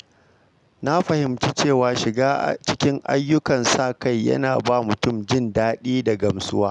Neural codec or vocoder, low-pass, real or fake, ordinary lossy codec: none; 10.8 kHz; real; none